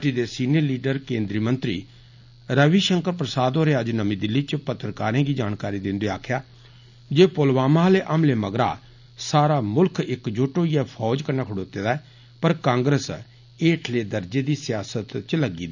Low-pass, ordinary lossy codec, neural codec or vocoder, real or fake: 7.2 kHz; none; none; real